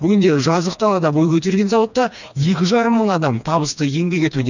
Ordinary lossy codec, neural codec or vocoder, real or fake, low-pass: none; codec, 16 kHz, 2 kbps, FreqCodec, smaller model; fake; 7.2 kHz